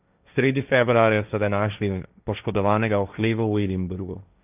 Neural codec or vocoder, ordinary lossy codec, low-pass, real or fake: codec, 16 kHz, 1.1 kbps, Voila-Tokenizer; none; 3.6 kHz; fake